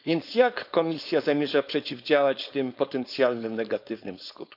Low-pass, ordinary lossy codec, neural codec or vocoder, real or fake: 5.4 kHz; none; codec, 16 kHz, 4.8 kbps, FACodec; fake